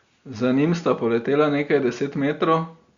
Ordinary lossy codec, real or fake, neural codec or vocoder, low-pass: Opus, 64 kbps; real; none; 7.2 kHz